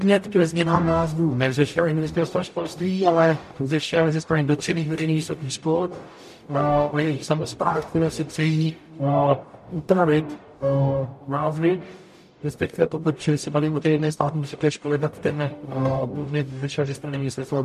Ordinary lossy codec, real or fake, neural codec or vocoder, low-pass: MP3, 64 kbps; fake; codec, 44.1 kHz, 0.9 kbps, DAC; 14.4 kHz